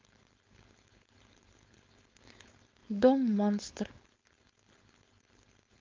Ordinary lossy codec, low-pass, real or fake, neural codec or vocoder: Opus, 32 kbps; 7.2 kHz; fake; codec, 16 kHz, 4.8 kbps, FACodec